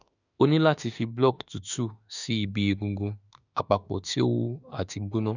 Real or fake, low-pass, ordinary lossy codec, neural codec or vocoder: fake; 7.2 kHz; none; autoencoder, 48 kHz, 32 numbers a frame, DAC-VAE, trained on Japanese speech